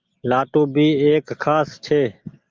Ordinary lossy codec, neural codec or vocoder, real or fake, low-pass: Opus, 32 kbps; none; real; 7.2 kHz